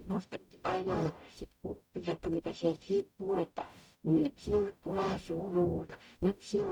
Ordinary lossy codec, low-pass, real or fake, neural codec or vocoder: none; 19.8 kHz; fake; codec, 44.1 kHz, 0.9 kbps, DAC